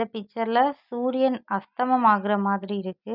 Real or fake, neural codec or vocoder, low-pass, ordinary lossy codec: real; none; 5.4 kHz; none